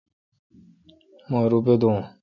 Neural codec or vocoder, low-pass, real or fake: none; 7.2 kHz; real